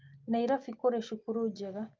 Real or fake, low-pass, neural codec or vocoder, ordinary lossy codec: real; 7.2 kHz; none; Opus, 24 kbps